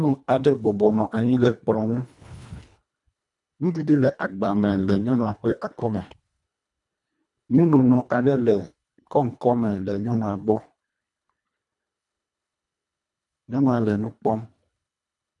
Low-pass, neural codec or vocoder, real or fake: 10.8 kHz; codec, 24 kHz, 1.5 kbps, HILCodec; fake